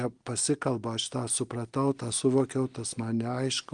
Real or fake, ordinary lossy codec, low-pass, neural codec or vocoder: real; Opus, 24 kbps; 9.9 kHz; none